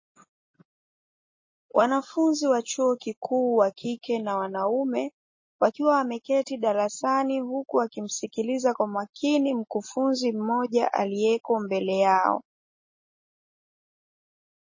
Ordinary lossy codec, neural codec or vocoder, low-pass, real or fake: MP3, 32 kbps; none; 7.2 kHz; real